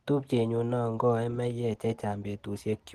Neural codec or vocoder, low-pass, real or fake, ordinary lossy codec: none; 14.4 kHz; real; Opus, 16 kbps